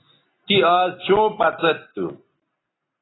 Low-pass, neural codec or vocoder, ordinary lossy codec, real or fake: 7.2 kHz; none; AAC, 16 kbps; real